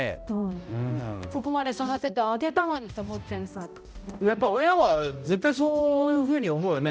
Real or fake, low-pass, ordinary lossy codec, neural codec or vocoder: fake; none; none; codec, 16 kHz, 0.5 kbps, X-Codec, HuBERT features, trained on balanced general audio